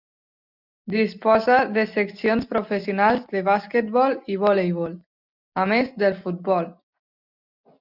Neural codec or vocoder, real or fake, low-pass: none; real; 5.4 kHz